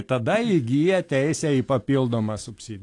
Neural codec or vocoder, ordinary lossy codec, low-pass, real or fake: none; AAC, 48 kbps; 10.8 kHz; real